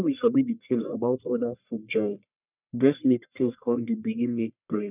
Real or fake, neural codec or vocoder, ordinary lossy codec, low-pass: fake; codec, 44.1 kHz, 1.7 kbps, Pupu-Codec; none; 3.6 kHz